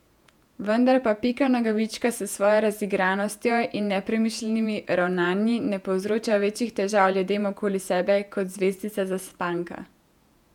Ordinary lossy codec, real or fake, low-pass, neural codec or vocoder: none; fake; 19.8 kHz; vocoder, 48 kHz, 128 mel bands, Vocos